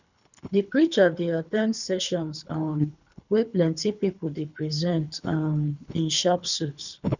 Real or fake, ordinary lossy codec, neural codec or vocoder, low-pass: fake; none; codec, 24 kHz, 3 kbps, HILCodec; 7.2 kHz